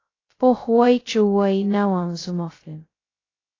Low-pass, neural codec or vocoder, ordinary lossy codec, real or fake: 7.2 kHz; codec, 16 kHz, 0.2 kbps, FocalCodec; AAC, 32 kbps; fake